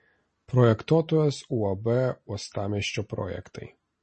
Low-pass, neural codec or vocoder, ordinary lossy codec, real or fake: 10.8 kHz; none; MP3, 32 kbps; real